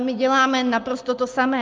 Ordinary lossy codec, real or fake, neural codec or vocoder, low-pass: Opus, 32 kbps; real; none; 7.2 kHz